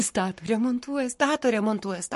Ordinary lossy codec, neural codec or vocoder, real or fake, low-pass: MP3, 48 kbps; codec, 44.1 kHz, 7.8 kbps, Pupu-Codec; fake; 14.4 kHz